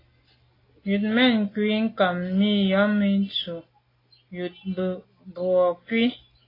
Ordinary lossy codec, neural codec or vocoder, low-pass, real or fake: AAC, 24 kbps; none; 5.4 kHz; real